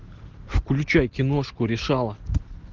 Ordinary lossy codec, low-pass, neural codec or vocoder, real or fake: Opus, 16 kbps; 7.2 kHz; none; real